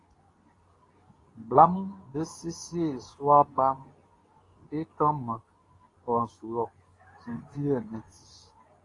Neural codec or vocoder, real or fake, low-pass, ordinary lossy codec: codec, 24 kHz, 0.9 kbps, WavTokenizer, medium speech release version 2; fake; 10.8 kHz; AAC, 32 kbps